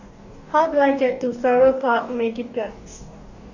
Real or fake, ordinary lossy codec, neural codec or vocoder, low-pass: fake; none; codec, 44.1 kHz, 2.6 kbps, DAC; 7.2 kHz